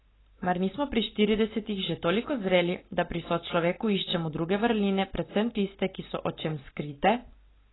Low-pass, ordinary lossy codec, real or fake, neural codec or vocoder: 7.2 kHz; AAC, 16 kbps; real; none